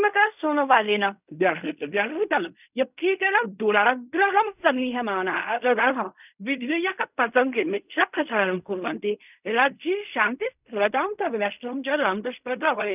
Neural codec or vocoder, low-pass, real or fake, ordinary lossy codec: codec, 16 kHz in and 24 kHz out, 0.4 kbps, LongCat-Audio-Codec, fine tuned four codebook decoder; 3.6 kHz; fake; none